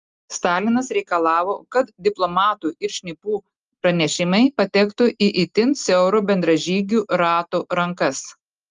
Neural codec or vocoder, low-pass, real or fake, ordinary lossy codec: none; 7.2 kHz; real; Opus, 32 kbps